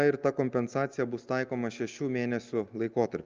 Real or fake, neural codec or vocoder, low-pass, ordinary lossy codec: real; none; 7.2 kHz; Opus, 24 kbps